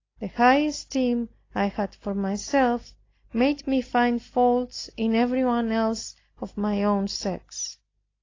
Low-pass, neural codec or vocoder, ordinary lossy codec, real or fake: 7.2 kHz; none; AAC, 32 kbps; real